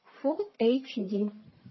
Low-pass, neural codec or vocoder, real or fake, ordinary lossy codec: 7.2 kHz; codec, 44.1 kHz, 1.7 kbps, Pupu-Codec; fake; MP3, 24 kbps